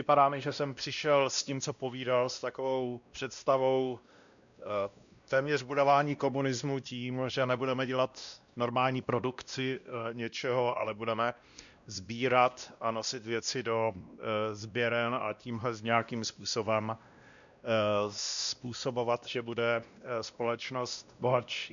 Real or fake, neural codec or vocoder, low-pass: fake; codec, 16 kHz, 1 kbps, X-Codec, WavLM features, trained on Multilingual LibriSpeech; 7.2 kHz